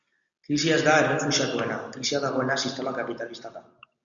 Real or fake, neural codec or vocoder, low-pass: real; none; 7.2 kHz